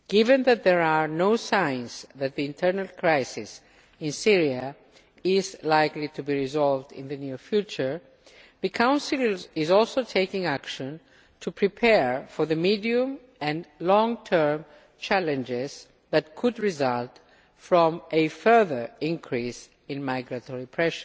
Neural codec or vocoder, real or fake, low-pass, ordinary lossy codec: none; real; none; none